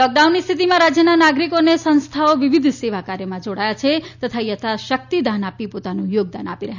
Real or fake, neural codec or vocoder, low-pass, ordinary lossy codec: real; none; 7.2 kHz; none